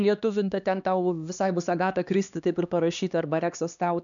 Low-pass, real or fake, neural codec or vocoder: 7.2 kHz; fake; codec, 16 kHz, 2 kbps, X-Codec, HuBERT features, trained on LibriSpeech